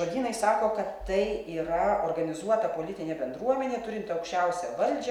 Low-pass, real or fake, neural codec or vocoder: 19.8 kHz; real; none